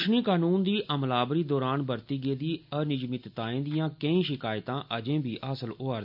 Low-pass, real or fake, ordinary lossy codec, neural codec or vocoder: 5.4 kHz; real; none; none